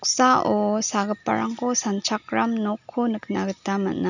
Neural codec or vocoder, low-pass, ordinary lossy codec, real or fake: none; 7.2 kHz; none; real